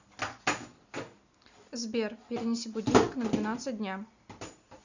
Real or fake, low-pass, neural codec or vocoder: real; 7.2 kHz; none